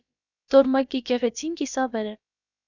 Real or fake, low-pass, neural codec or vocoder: fake; 7.2 kHz; codec, 16 kHz, about 1 kbps, DyCAST, with the encoder's durations